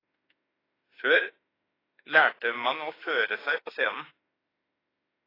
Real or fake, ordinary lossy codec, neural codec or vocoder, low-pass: fake; AAC, 24 kbps; autoencoder, 48 kHz, 32 numbers a frame, DAC-VAE, trained on Japanese speech; 5.4 kHz